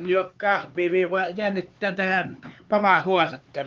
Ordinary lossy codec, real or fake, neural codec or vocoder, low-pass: Opus, 24 kbps; fake; codec, 16 kHz, 4 kbps, X-Codec, HuBERT features, trained on LibriSpeech; 7.2 kHz